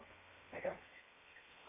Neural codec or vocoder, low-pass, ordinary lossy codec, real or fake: codec, 16 kHz in and 24 kHz out, 0.8 kbps, FocalCodec, streaming, 65536 codes; 3.6 kHz; AAC, 16 kbps; fake